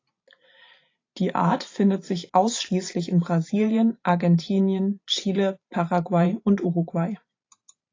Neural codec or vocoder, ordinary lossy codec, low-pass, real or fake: vocoder, 44.1 kHz, 128 mel bands every 512 samples, BigVGAN v2; AAC, 32 kbps; 7.2 kHz; fake